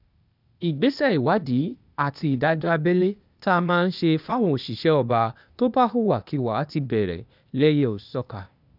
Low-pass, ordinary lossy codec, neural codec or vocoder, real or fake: 5.4 kHz; none; codec, 16 kHz, 0.8 kbps, ZipCodec; fake